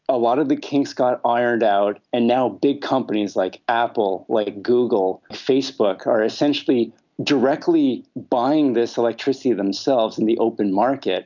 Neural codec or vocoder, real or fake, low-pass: none; real; 7.2 kHz